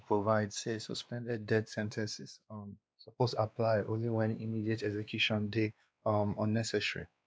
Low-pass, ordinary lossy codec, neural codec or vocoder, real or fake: none; none; codec, 16 kHz, 2 kbps, X-Codec, WavLM features, trained on Multilingual LibriSpeech; fake